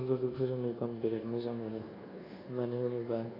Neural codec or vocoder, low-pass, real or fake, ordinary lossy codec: codec, 24 kHz, 1.2 kbps, DualCodec; 5.4 kHz; fake; AAC, 24 kbps